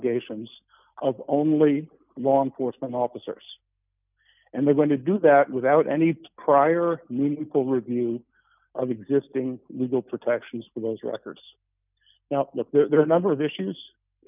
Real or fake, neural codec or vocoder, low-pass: real; none; 3.6 kHz